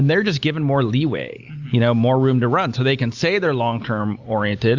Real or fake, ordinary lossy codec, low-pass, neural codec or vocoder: real; Opus, 64 kbps; 7.2 kHz; none